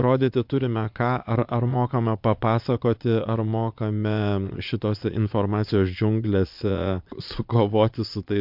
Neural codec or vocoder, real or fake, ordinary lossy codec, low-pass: vocoder, 22.05 kHz, 80 mel bands, Vocos; fake; MP3, 48 kbps; 5.4 kHz